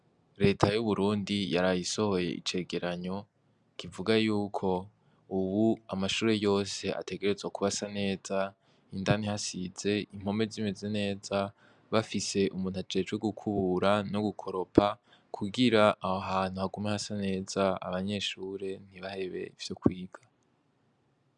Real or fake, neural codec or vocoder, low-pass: real; none; 10.8 kHz